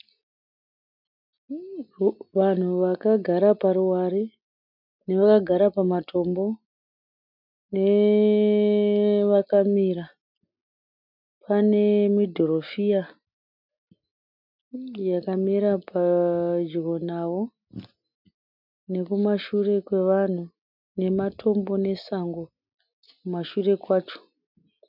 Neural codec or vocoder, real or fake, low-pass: none; real; 5.4 kHz